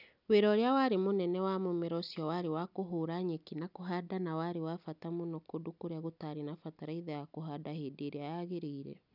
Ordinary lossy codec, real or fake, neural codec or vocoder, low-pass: none; real; none; 5.4 kHz